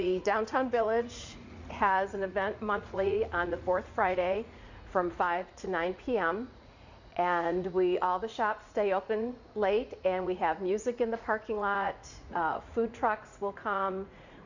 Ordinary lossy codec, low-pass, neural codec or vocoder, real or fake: Opus, 64 kbps; 7.2 kHz; vocoder, 44.1 kHz, 80 mel bands, Vocos; fake